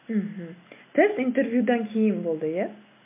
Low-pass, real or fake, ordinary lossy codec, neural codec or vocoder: 3.6 kHz; real; MP3, 24 kbps; none